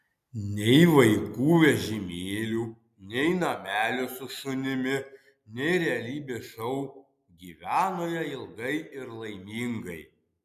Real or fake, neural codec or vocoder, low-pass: real; none; 14.4 kHz